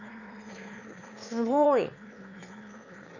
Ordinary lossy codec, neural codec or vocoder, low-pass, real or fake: none; autoencoder, 22.05 kHz, a latent of 192 numbers a frame, VITS, trained on one speaker; 7.2 kHz; fake